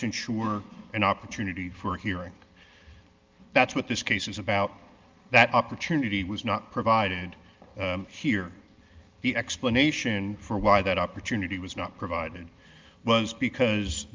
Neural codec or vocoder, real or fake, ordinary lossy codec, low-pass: none; real; Opus, 24 kbps; 7.2 kHz